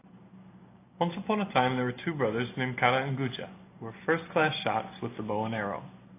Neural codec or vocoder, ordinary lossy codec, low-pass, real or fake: none; AAC, 24 kbps; 3.6 kHz; real